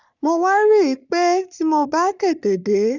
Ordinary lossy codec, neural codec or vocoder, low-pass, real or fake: none; codec, 44.1 kHz, 3.4 kbps, Pupu-Codec; 7.2 kHz; fake